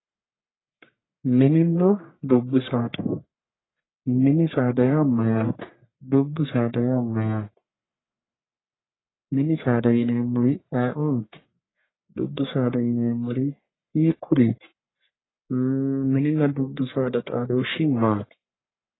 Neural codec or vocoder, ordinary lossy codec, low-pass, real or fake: codec, 44.1 kHz, 1.7 kbps, Pupu-Codec; AAC, 16 kbps; 7.2 kHz; fake